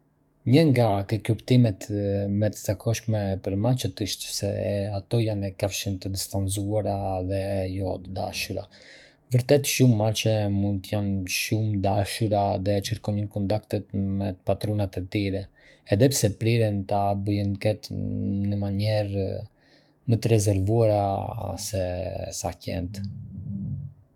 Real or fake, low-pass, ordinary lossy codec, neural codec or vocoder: fake; 19.8 kHz; none; codec, 44.1 kHz, 7.8 kbps, DAC